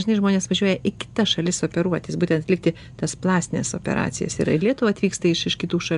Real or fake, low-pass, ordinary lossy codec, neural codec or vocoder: real; 10.8 kHz; AAC, 96 kbps; none